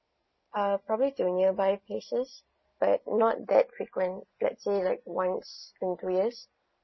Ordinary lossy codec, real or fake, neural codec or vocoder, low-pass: MP3, 24 kbps; real; none; 7.2 kHz